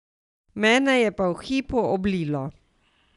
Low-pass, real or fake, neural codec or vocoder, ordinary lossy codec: 10.8 kHz; real; none; none